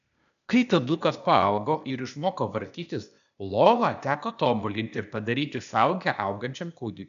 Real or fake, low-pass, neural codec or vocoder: fake; 7.2 kHz; codec, 16 kHz, 0.8 kbps, ZipCodec